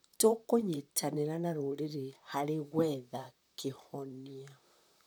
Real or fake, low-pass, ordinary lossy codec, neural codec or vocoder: fake; none; none; vocoder, 44.1 kHz, 128 mel bands, Pupu-Vocoder